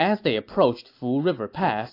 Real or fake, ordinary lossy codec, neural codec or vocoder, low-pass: real; AAC, 32 kbps; none; 5.4 kHz